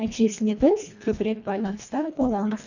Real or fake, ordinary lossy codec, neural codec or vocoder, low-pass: fake; none; codec, 24 kHz, 1.5 kbps, HILCodec; 7.2 kHz